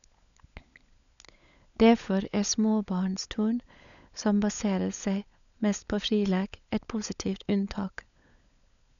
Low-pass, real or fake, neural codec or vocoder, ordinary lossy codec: 7.2 kHz; fake; codec, 16 kHz, 16 kbps, FunCodec, trained on LibriTTS, 50 frames a second; none